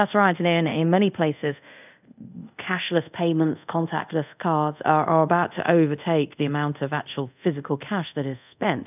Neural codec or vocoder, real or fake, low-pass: codec, 24 kHz, 0.5 kbps, DualCodec; fake; 3.6 kHz